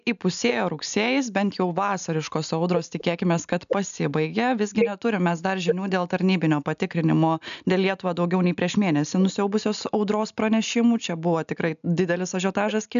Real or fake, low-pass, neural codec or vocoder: real; 7.2 kHz; none